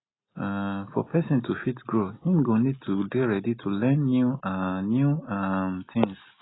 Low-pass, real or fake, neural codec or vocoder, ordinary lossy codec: 7.2 kHz; real; none; AAC, 16 kbps